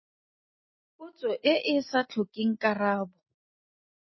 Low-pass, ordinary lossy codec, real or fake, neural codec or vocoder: 7.2 kHz; MP3, 24 kbps; real; none